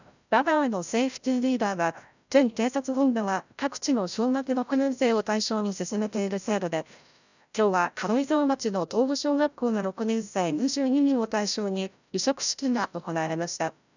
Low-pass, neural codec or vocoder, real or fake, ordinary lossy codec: 7.2 kHz; codec, 16 kHz, 0.5 kbps, FreqCodec, larger model; fake; none